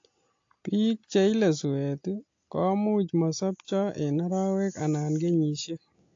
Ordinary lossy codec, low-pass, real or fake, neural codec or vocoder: AAC, 48 kbps; 7.2 kHz; real; none